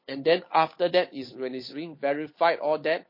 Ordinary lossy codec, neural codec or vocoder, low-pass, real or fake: MP3, 24 kbps; codec, 16 kHz, 8 kbps, FunCodec, trained on Chinese and English, 25 frames a second; 5.4 kHz; fake